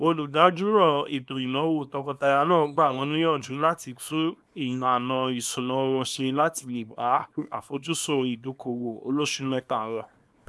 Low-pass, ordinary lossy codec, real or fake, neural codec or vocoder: none; none; fake; codec, 24 kHz, 0.9 kbps, WavTokenizer, small release